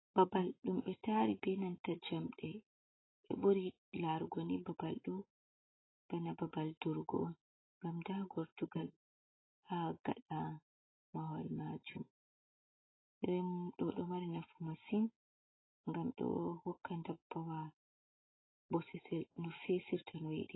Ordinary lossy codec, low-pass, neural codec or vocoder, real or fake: AAC, 16 kbps; 7.2 kHz; none; real